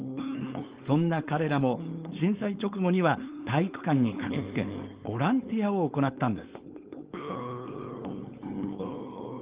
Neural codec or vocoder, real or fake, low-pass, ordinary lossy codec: codec, 16 kHz, 4.8 kbps, FACodec; fake; 3.6 kHz; Opus, 24 kbps